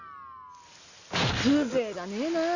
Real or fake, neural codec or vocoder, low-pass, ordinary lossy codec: real; none; 7.2 kHz; none